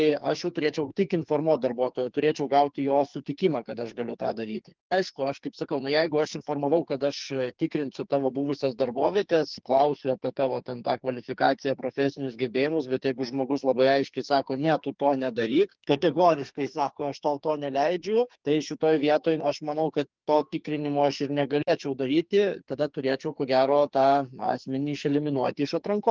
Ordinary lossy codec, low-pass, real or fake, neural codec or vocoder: Opus, 32 kbps; 7.2 kHz; fake; codec, 44.1 kHz, 2.6 kbps, SNAC